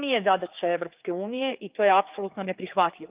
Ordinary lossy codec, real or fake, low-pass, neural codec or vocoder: Opus, 32 kbps; fake; 3.6 kHz; codec, 16 kHz, 4 kbps, X-Codec, HuBERT features, trained on general audio